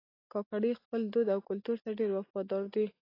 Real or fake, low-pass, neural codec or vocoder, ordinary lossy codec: real; 5.4 kHz; none; AAC, 24 kbps